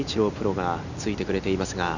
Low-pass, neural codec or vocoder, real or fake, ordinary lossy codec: 7.2 kHz; none; real; none